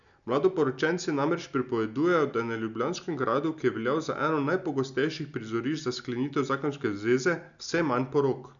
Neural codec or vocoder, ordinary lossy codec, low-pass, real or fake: none; none; 7.2 kHz; real